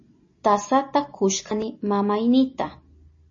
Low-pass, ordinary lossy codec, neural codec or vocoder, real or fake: 7.2 kHz; MP3, 32 kbps; none; real